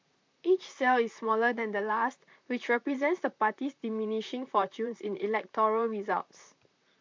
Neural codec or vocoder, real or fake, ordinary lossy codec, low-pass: vocoder, 44.1 kHz, 128 mel bands, Pupu-Vocoder; fake; MP3, 64 kbps; 7.2 kHz